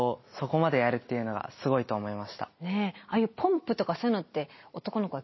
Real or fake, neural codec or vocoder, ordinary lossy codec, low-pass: real; none; MP3, 24 kbps; 7.2 kHz